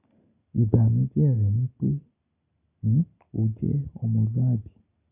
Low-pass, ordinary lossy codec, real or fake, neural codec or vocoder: 3.6 kHz; none; real; none